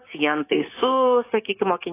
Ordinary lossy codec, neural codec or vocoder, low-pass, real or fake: AAC, 16 kbps; codec, 16 kHz, 6 kbps, DAC; 3.6 kHz; fake